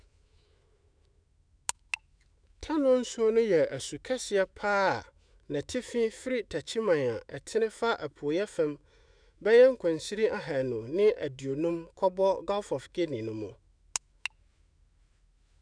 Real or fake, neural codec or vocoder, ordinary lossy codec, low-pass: fake; autoencoder, 48 kHz, 128 numbers a frame, DAC-VAE, trained on Japanese speech; none; 9.9 kHz